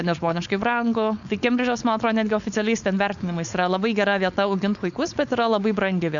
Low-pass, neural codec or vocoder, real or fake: 7.2 kHz; codec, 16 kHz, 4.8 kbps, FACodec; fake